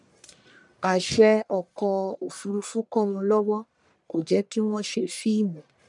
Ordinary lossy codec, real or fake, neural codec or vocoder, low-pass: none; fake; codec, 44.1 kHz, 1.7 kbps, Pupu-Codec; 10.8 kHz